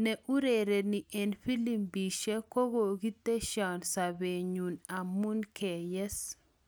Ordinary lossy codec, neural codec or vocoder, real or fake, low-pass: none; none; real; none